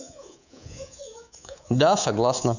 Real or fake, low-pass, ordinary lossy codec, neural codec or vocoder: fake; 7.2 kHz; none; codec, 24 kHz, 3.1 kbps, DualCodec